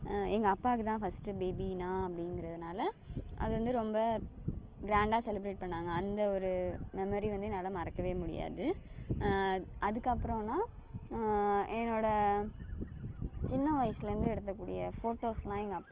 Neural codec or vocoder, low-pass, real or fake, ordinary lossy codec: none; 3.6 kHz; real; Opus, 16 kbps